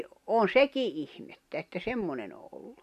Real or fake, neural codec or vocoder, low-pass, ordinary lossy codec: real; none; 14.4 kHz; none